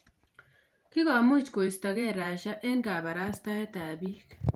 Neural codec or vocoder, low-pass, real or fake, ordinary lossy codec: vocoder, 44.1 kHz, 128 mel bands every 512 samples, BigVGAN v2; 19.8 kHz; fake; Opus, 32 kbps